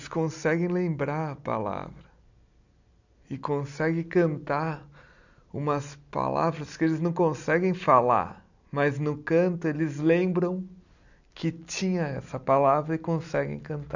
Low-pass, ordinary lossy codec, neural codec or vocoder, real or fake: 7.2 kHz; none; none; real